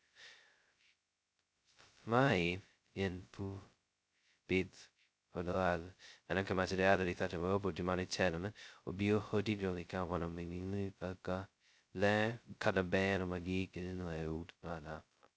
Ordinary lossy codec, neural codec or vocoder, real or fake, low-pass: none; codec, 16 kHz, 0.2 kbps, FocalCodec; fake; none